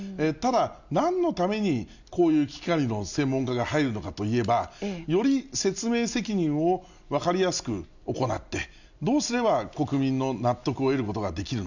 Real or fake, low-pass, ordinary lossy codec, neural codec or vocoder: real; 7.2 kHz; none; none